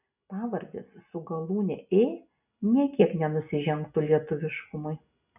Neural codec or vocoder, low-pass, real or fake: none; 3.6 kHz; real